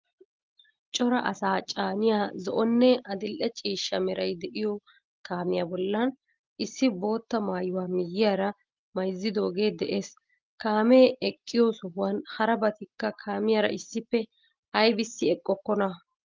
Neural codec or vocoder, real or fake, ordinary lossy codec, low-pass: none; real; Opus, 32 kbps; 7.2 kHz